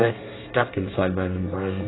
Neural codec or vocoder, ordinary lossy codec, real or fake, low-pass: codec, 24 kHz, 1 kbps, SNAC; AAC, 16 kbps; fake; 7.2 kHz